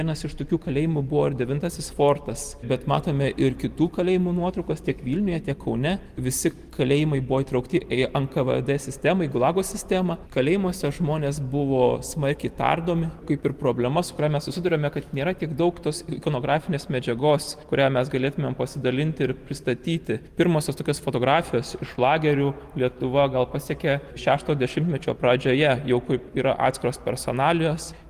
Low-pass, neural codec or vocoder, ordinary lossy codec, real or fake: 14.4 kHz; none; Opus, 24 kbps; real